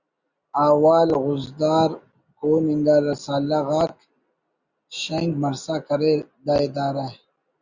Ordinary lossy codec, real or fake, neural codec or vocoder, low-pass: Opus, 64 kbps; real; none; 7.2 kHz